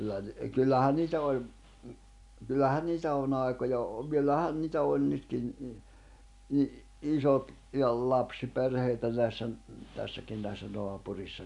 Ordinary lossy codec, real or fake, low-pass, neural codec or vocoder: none; real; 10.8 kHz; none